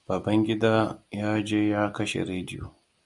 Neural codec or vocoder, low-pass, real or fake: none; 10.8 kHz; real